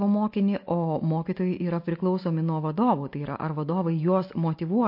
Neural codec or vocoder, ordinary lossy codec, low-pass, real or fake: none; MP3, 32 kbps; 5.4 kHz; real